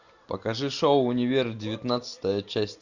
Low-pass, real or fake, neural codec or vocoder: 7.2 kHz; real; none